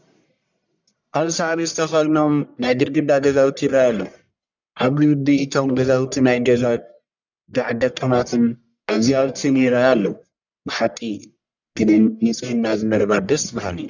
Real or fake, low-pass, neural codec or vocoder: fake; 7.2 kHz; codec, 44.1 kHz, 1.7 kbps, Pupu-Codec